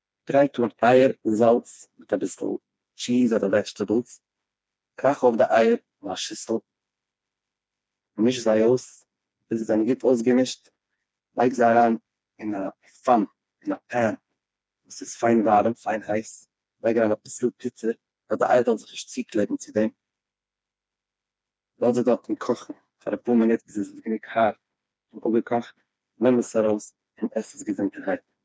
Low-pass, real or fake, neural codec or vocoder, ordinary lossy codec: none; fake; codec, 16 kHz, 2 kbps, FreqCodec, smaller model; none